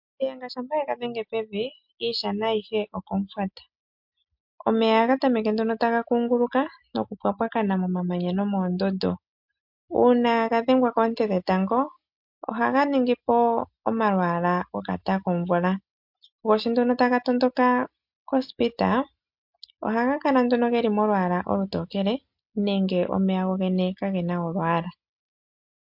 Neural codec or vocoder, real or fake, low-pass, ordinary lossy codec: none; real; 5.4 kHz; MP3, 48 kbps